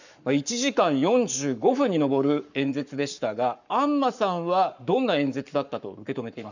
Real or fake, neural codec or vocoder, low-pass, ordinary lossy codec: fake; codec, 44.1 kHz, 7.8 kbps, Pupu-Codec; 7.2 kHz; none